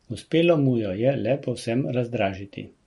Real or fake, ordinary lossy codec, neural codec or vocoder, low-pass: real; MP3, 48 kbps; none; 19.8 kHz